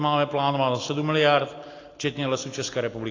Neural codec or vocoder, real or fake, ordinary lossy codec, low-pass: none; real; AAC, 32 kbps; 7.2 kHz